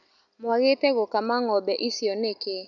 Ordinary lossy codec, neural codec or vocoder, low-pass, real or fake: none; none; 7.2 kHz; real